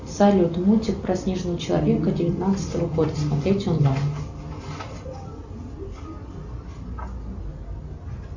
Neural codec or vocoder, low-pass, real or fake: none; 7.2 kHz; real